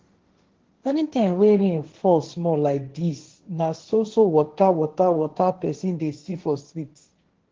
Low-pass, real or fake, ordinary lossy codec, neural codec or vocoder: 7.2 kHz; fake; Opus, 16 kbps; codec, 16 kHz, 1.1 kbps, Voila-Tokenizer